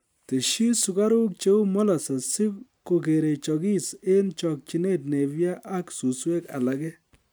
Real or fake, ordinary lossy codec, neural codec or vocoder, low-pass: real; none; none; none